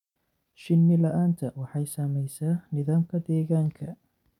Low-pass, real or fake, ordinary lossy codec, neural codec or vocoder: 19.8 kHz; real; none; none